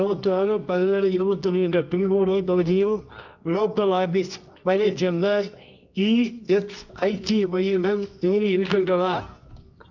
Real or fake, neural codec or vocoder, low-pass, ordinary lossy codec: fake; codec, 24 kHz, 0.9 kbps, WavTokenizer, medium music audio release; 7.2 kHz; none